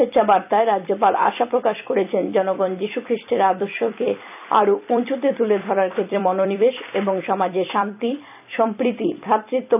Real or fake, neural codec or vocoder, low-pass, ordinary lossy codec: fake; vocoder, 44.1 kHz, 128 mel bands every 256 samples, BigVGAN v2; 3.6 kHz; none